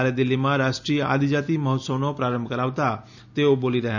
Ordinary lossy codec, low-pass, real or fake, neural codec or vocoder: none; 7.2 kHz; real; none